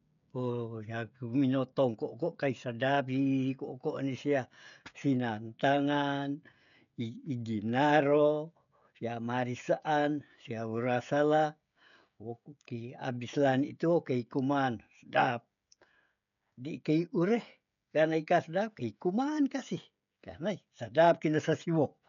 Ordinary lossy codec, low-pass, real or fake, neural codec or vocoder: none; 7.2 kHz; fake; codec, 16 kHz, 16 kbps, FreqCodec, smaller model